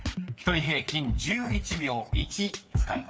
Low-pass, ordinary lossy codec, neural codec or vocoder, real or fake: none; none; codec, 16 kHz, 4 kbps, FreqCodec, larger model; fake